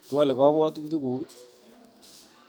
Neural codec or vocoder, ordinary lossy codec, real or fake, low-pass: codec, 44.1 kHz, 2.6 kbps, SNAC; none; fake; none